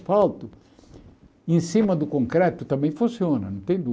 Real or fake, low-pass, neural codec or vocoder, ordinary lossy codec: real; none; none; none